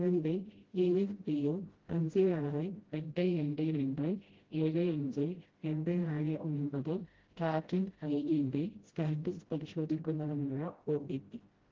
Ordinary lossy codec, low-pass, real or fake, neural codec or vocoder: Opus, 16 kbps; 7.2 kHz; fake; codec, 16 kHz, 0.5 kbps, FreqCodec, smaller model